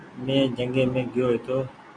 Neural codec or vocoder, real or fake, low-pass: none; real; 9.9 kHz